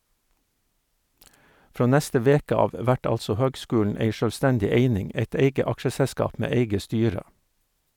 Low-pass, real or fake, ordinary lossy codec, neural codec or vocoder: 19.8 kHz; real; none; none